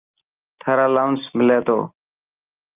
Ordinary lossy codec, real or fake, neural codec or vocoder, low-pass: Opus, 32 kbps; real; none; 3.6 kHz